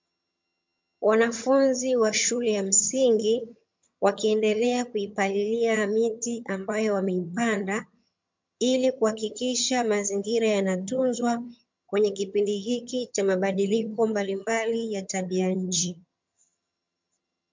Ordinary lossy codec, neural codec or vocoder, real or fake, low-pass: MP3, 64 kbps; vocoder, 22.05 kHz, 80 mel bands, HiFi-GAN; fake; 7.2 kHz